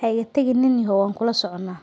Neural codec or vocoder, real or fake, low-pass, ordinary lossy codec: none; real; none; none